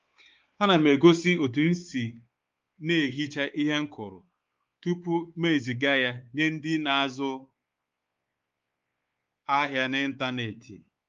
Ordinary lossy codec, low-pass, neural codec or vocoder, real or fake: Opus, 32 kbps; 7.2 kHz; codec, 16 kHz, 4 kbps, X-Codec, WavLM features, trained on Multilingual LibriSpeech; fake